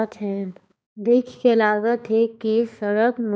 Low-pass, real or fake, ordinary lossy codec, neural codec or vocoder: none; fake; none; codec, 16 kHz, 2 kbps, X-Codec, HuBERT features, trained on balanced general audio